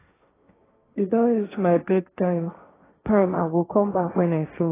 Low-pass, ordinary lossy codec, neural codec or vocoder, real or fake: 3.6 kHz; AAC, 16 kbps; codec, 16 kHz, 1.1 kbps, Voila-Tokenizer; fake